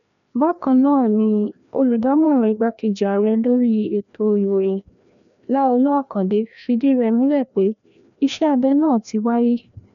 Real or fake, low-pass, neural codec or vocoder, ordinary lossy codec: fake; 7.2 kHz; codec, 16 kHz, 1 kbps, FreqCodec, larger model; none